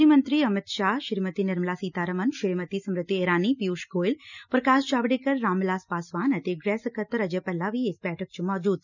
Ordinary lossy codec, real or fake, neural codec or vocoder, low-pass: none; real; none; 7.2 kHz